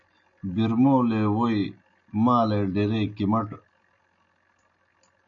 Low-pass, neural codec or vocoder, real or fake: 7.2 kHz; none; real